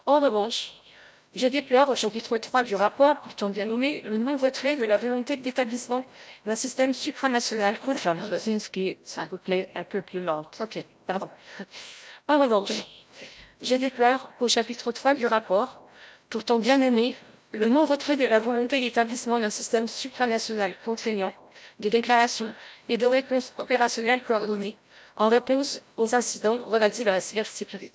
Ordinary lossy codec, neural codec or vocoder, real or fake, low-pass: none; codec, 16 kHz, 0.5 kbps, FreqCodec, larger model; fake; none